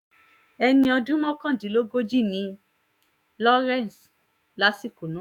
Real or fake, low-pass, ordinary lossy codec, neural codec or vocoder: fake; 19.8 kHz; none; autoencoder, 48 kHz, 128 numbers a frame, DAC-VAE, trained on Japanese speech